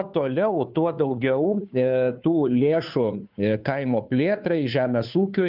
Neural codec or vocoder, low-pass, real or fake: codec, 16 kHz, 2 kbps, FunCodec, trained on Chinese and English, 25 frames a second; 5.4 kHz; fake